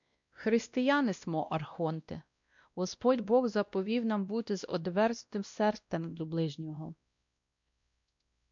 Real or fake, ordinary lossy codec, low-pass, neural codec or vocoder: fake; MP3, 64 kbps; 7.2 kHz; codec, 16 kHz, 1 kbps, X-Codec, WavLM features, trained on Multilingual LibriSpeech